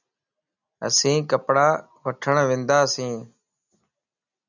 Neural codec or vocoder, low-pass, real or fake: none; 7.2 kHz; real